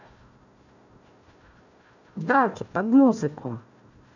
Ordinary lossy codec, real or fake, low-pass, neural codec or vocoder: none; fake; 7.2 kHz; codec, 16 kHz, 1 kbps, FunCodec, trained on Chinese and English, 50 frames a second